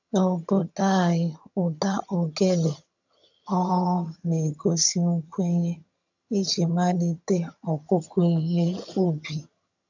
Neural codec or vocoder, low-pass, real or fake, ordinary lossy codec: vocoder, 22.05 kHz, 80 mel bands, HiFi-GAN; 7.2 kHz; fake; none